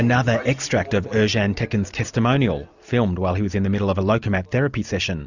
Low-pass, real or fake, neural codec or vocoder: 7.2 kHz; real; none